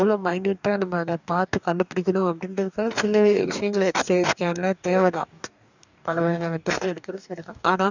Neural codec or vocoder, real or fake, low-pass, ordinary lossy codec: codec, 44.1 kHz, 2.6 kbps, DAC; fake; 7.2 kHz; none